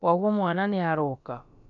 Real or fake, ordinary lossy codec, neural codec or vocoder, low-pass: fake; none; codec, 16 kHz, about 1 kbps, DyCAST, with the encoder's durations; 7.2 kHz